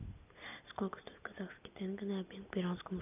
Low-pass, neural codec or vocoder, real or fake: 3.6 kHz; none; real